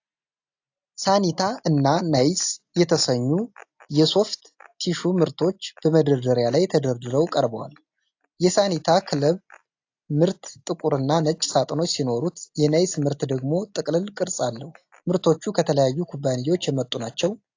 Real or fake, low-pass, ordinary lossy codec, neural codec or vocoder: real; 7.2 kHz; AAC, 48 kbps; none